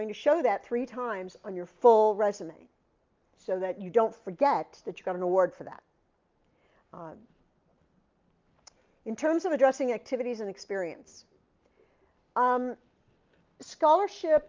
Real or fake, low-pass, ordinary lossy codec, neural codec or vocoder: real; 7.2 kHz; Opus, 24 kbps; none